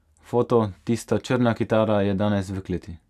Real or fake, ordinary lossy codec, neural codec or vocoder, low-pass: real; none; none; 14.4 kHz